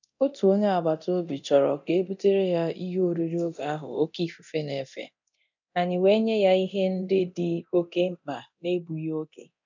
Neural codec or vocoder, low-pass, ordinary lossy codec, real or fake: codec, 24 kHz, 0.9 kbps, DualCodec; 7.2 kHz; none; fake